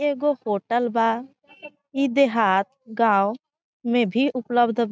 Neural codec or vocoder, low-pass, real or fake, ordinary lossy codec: none; none; real; none